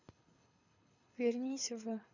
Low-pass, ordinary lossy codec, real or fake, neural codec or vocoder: 7.2 kHz; none; fake; codec, 24 kHz, 6 kbps, HILCodec